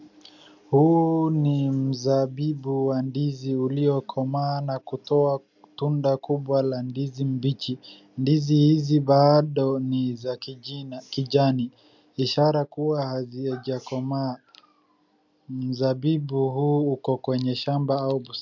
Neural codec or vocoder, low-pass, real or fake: none; 7.2 kHz; real